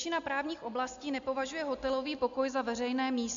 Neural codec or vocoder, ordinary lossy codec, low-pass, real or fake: none; MP3, 64 kbps; 7.2 kHz; real